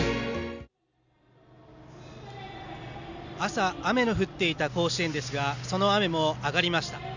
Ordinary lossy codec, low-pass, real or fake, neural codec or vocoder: none; 7.2 kHz; real; none